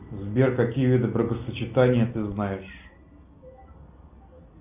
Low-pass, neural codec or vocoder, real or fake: 3.6 kHz; none; real